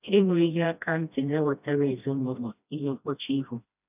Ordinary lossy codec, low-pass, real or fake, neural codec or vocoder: none; 3.6 kHz; fake; codec, 16 kHz, 1 kbps, FreqCodec, smaller model